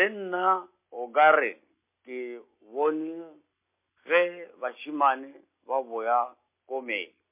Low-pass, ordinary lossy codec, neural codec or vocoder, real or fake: 3.6 kHz; MP3, 24 kbps; none; real